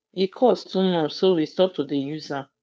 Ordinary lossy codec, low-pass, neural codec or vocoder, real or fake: none; none; codec, 16 kHz, 2 kbps, FunCodec, trained on Chinese and English, 25 frames a second; fake